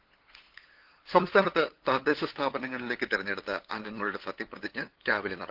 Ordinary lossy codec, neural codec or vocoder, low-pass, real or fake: Opus, 16 kbps; codec, 16 kHz, 8 kbps, FunCodec, trained on LibriTTS, 25 frames a second; 5.4 kHz; fake